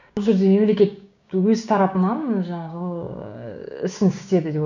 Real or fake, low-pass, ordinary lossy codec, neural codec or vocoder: fake; 7.2 kHz; none; codec, 16 kHz, 6 kbps, DAC